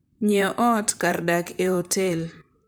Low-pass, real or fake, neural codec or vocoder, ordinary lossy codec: none; fake; vocoder, 44.1 kHz, 128 mel bands, Pupu-Vocoder; none